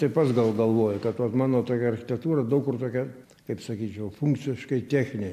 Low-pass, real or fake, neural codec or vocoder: 14.4 kHz; real; none